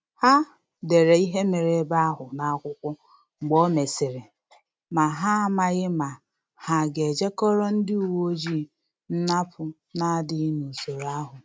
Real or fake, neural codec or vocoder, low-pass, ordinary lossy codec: real; none; none; none